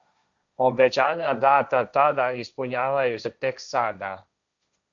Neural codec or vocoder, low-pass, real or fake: codec, 16 kHz, 1.1 kbps, Voila-Tokenizer; 7.2 kHz; fake